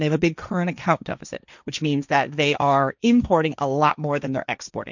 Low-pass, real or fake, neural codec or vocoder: 7.2 kHz; fake; codec, 16 kHz, 1.1 kbps, Voila-Tokenizer